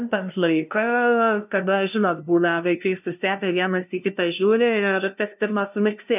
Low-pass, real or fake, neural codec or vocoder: 3.6 kHz; fake; codec, 16 kHz, 0.5 kbps, FunCodec, trained on LibriTTS, 25 frames a second